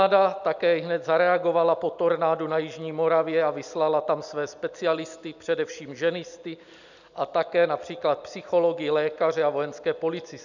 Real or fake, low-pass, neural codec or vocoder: real; 7.2 kHz; none